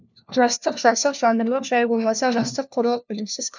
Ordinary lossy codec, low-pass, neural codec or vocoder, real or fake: none; 7.2 kHz; codec, 16 kHz, 1 kbps, FunCodec, trained on LibriTTS, 50 frames a second; fake